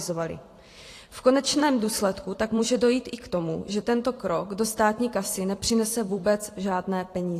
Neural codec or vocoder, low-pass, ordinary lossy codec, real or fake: vocoder, 44.1 kHz, 128 mel bands every 512 samples, BigVGAN v2; 14.4 kHz; AAC, 48 kbps; fake